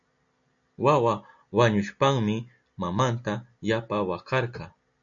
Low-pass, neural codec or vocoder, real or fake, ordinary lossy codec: 7.2 kHz; none; real; MP3, 64 kbps